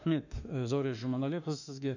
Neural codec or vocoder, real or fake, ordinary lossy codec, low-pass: autoencoder, 48 kHz, 32 numbers a frame, DAC-VAE, trained on Japanese speech; fake; none; 7.2 kHz